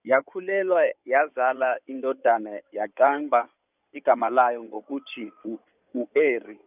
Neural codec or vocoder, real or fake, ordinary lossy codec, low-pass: codec, 16 kHz in and 24 kHz out, 2.2 kbps, FireRedTTS-2 codec; fake; none; 3.6 kHz